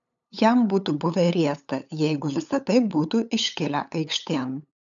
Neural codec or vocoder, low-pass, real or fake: codec, 16 kHz, 8 kbps, FunCodec, trained on LibriTTS, 25 frames a second; 7.2 kHz; fake